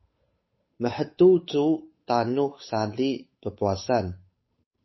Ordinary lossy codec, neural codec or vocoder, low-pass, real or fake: MP3, 24 kbps; codec, 16 kHz, 8 kbps, FunCodec, trained on Chinese and English, 25 frames a second; 7.2 kHz; fake